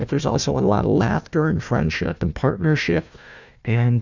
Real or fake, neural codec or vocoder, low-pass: fake; codec, 16 kHz, 1 kbps, FunCodec, trained on Chinese and English, 50 frames a second; 7.2 kHz